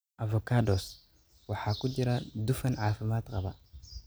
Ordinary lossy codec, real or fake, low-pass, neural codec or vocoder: none; real; none; none